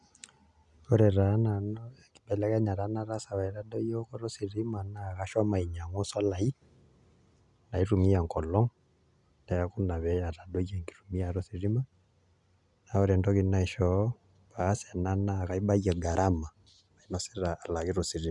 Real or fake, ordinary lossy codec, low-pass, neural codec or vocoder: real; none; 10.8 kHz; none